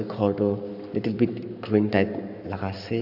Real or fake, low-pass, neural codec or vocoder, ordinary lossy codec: fake; 5.4 kHz; codec, 44.1 kHz, 7.8 kbps, Pupu-Codec; none